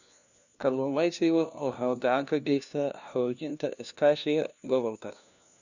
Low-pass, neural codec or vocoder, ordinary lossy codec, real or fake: 7.2 kHz; codec, 16 kHz, 1 kbps, FunCodec, trained on LibriTTS, 50 frames a second; none; fake